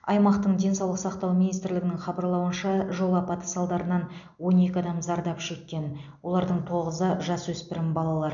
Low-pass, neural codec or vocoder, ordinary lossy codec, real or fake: 7.2 kHz; none; none; real